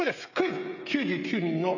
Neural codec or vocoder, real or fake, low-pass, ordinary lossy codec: codec, 16 kHz, 16 kbps, FreqCodec, smaller model; fake; 7.2 kHz; none